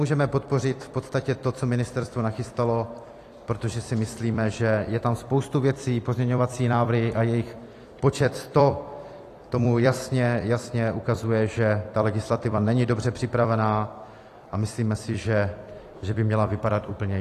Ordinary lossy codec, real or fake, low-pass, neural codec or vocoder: AAC, 48 kbps; fake; 14.4 kHz; vocoder, 44.1 kHz, 128 mel bands every 256 samples, BigVGAN v2